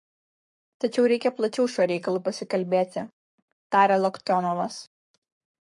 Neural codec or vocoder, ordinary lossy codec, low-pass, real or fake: codec, 44.1 kHz, 7.8 kbps, Pupu-Codec; MP3, 48 kbps; 10.8 kHz; fake